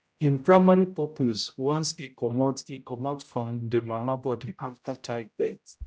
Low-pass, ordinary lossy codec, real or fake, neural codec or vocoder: none; none; fake; codec, 16 kHz, 0.5 kbps, X-Codec, HuBERT features, trained on general audio